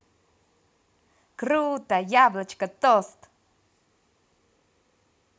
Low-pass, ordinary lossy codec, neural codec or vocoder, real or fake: none; none; none; real